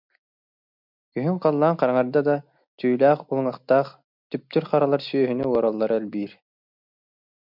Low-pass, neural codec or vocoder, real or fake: 5.4 kHz; none; real